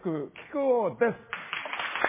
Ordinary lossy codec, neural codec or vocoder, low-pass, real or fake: MP3, 16 kbps; none; 3.6 kHz; real